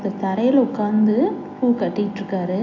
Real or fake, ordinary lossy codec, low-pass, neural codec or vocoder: real; AAC, 48 kbps; 7.2 kHz; none